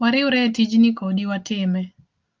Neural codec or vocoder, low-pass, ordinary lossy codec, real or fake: none; 7.2 kHz; Opus, 24 kbps; real